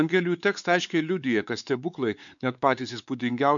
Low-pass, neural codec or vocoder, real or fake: 7.2 kHz; codec, 16 kHz, 4 kbps, X-Codec, WavLM features, trained on Multilingual LibriSpeech; fake